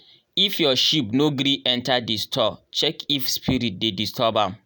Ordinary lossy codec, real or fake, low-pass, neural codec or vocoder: none; real; none; none